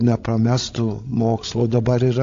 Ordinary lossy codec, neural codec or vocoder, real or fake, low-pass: AAC, 48 kbps; codec, 16 kHz, 16 kbps, FreqCodec, larger model; fake; 7.2 kHz